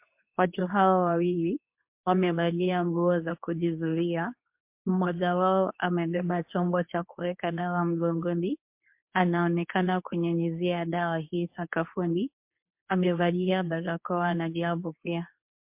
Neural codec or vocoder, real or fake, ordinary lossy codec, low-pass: codec, 24 kHz, 0.9 kbps, WavTokenizer, medium speech release version 2; fake; MP3, 32 kbps; 3.6 kHz